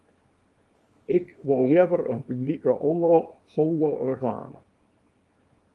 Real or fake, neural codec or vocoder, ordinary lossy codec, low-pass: fake; codec, 24 kHz, 0.9 kbps, WavTokenizer, small release; Opus, 32 kbps; 10.8 kHz